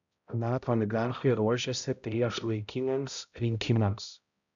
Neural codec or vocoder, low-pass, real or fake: codec, 16 kHz, 0.5 kbps, X-Codec, HuBERT features, trained on balanced general audio; 7.2 kHz; fake